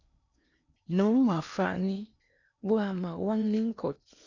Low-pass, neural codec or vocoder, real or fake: 7.2 kHz; codec, 16 kHz in and 24 kHz out, 0.8 kbps, FocalCodec, streaming, 65536 codes; fake